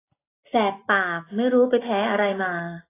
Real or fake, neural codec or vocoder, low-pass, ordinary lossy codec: real; none; 3.6 kHz; AAC, 16 kbps